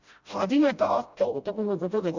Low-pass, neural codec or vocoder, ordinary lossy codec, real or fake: 7.2 kHz; codec, 16 kHz, 0.5 kbps, FreqCodec, smaller model; Opus, 64 kbps; fake